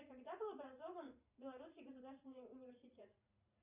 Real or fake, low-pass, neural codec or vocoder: fake; 3.6 kHz; vocoder, 44.1 kHz, 80 mel bands, Vocos